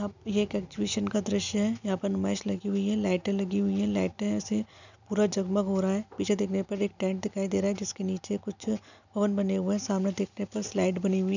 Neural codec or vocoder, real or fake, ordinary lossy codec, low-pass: none; real; none; 7.2 kHz